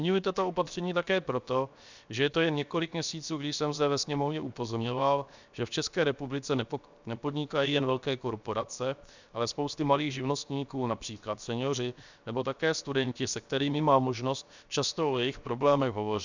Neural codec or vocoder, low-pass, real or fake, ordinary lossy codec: codec, 16 kHz, 0.7 kbps, FocalCodec; 7.2 kHz; fake; Opus, 64 kbps